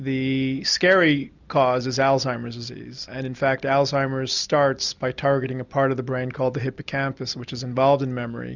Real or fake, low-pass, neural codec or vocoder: real; 7.2 kHz; none